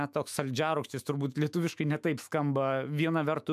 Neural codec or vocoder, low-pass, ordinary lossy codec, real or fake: autoencoder, 48 kHz, 128 numbers a frame, DAC-VAE, trained on Japanese speech; 14.4 kHz; MP3, 96 kbps; fake